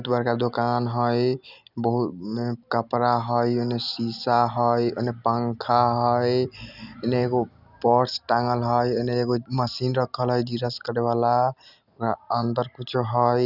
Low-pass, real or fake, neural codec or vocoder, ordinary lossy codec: 5.4 kHz; real; none; none